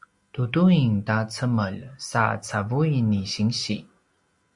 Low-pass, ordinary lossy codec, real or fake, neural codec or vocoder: 10.8 kHz; Opus, 64 kbps; real; none